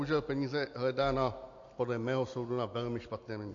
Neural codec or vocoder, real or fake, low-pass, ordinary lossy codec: none; real; 7.2 kHz; AAC, 48 kbps